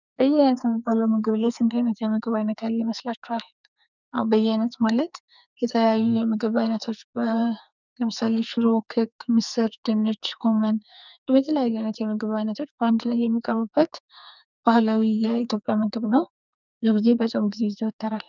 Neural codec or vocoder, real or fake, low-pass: codec, 44.1 kHz, 2.6 kbps, SNAC; fake; 7.2 kHz